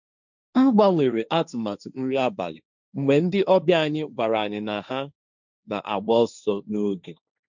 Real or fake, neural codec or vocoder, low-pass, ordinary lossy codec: fake; codec, 16 kHz, 1.1 kbps, Voila-Tokenizer; 7.2 kHz; none